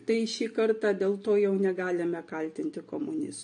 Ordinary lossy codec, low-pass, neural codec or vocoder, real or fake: AAC, 64 kbps; 9.9 kHz; none; real